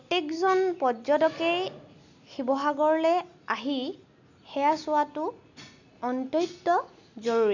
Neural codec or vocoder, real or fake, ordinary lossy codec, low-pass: none; real; none; 7.2 kHz